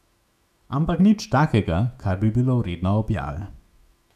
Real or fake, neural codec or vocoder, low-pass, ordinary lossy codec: fake; autoencoder, 48 kHz, 128 numbers a frame, DAC-VAE, trained on Japanese speech; 14.4 kHz; none